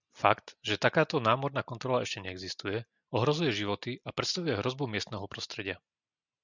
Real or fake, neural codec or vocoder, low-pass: real; none; 7.2 kHz